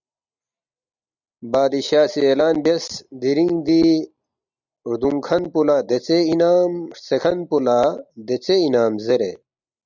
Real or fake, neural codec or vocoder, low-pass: real; none; 7.2 kHz